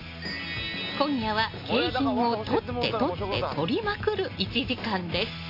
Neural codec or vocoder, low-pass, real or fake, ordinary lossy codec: none; 5.4 kHz; real; AAC, 32 kbps